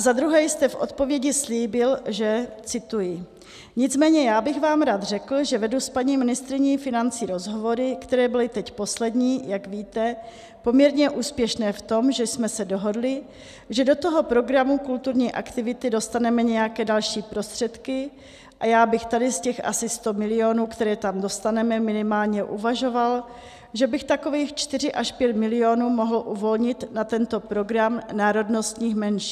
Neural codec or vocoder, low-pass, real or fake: none; 14.4 kHz; real